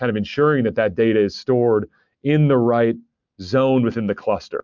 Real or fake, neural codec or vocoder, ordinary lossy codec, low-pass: real; none; MP3, 64 kbps; 7.2 kHz